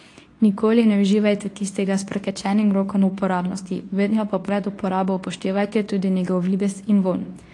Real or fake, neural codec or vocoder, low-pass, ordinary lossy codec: fake; codec, 24 kHz, 0.9 kbps, WavTokenizer, medium speech release version 2; 10.8 kHz; AAC, 48 kbps